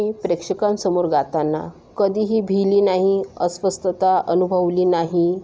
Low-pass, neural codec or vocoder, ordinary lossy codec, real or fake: none; none; none; real